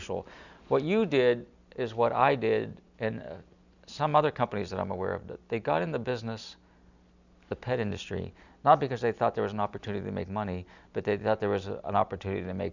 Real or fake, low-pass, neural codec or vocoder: real; 7.2 kHz; none